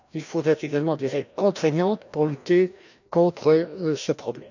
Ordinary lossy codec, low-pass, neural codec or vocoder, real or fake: none; 7.2 kHz; codec, 16 kHz, 1 kbps, FreqCodec, larger model; fake